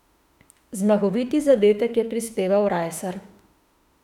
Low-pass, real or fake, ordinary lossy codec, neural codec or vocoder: 19.8 kHz; fake; none; autoencoder, 48 kHz, 32 numbers a frame, DAC-VAE, trained on Japanese speech